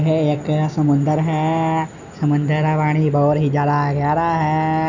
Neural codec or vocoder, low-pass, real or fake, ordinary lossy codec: none; 7.2 kHz; real; none